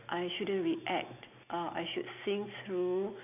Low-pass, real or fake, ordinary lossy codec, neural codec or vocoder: 3.6 kHz; real; none; none